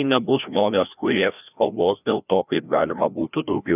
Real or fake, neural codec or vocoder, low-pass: fake; codec, 16 kHz, 1 kbps, FreqCodec, larger model; 3.6 kHz